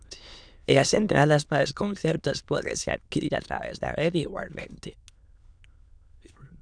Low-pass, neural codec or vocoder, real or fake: 9.9 kHz; autoencoder, 22.05 kHz, a latent of 192 numbers a frame, VITS, trained on many speakers; fake